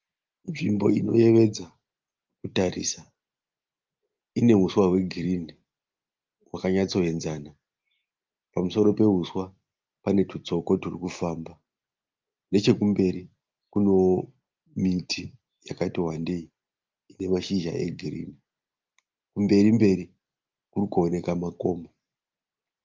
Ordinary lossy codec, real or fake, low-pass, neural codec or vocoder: Opus, 32 kbps; real; 7.2 kHz; none